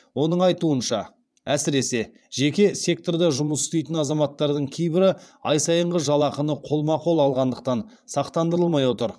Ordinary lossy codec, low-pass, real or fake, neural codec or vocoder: none; none; fake; vocoder, 22.05 kHz, 80 mel bands, Vocos